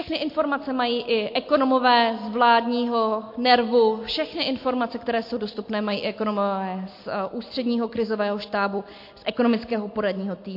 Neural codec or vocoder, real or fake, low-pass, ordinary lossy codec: none; real; 5.4 kHz; MP3, 32 kbps